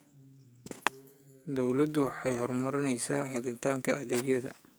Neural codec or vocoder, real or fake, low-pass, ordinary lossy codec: codec, 44.1 kHz, 2.6 kbps, SNAC; fake; none; none